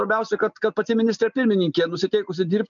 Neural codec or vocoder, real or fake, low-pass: none; real; 7.2 kHz